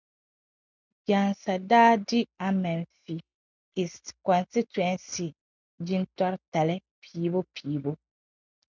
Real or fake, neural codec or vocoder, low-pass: real; none; 7.2 kHz